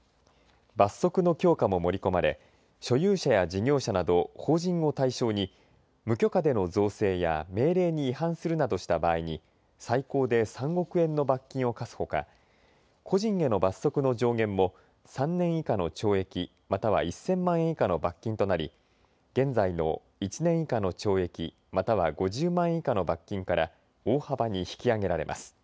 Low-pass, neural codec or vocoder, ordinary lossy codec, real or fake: none; none; none; real